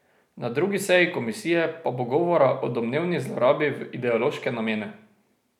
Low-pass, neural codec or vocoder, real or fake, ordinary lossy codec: 19.8 kHz; none; real; none